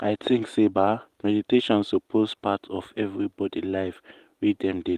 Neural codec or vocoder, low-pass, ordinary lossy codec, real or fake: none; 14.4 kHz; Opus, 24 kbps; real